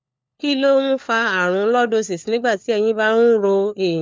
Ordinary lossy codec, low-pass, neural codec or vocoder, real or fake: none; none; codec, 16 kHz, 4 kbps, FunCodec, trained on LibriTTS, 50 frames a second; fake